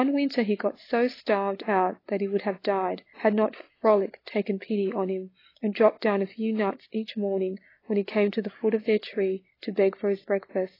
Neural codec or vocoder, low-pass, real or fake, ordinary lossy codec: none; 5.4 kHz; real; AAC, 24 kbps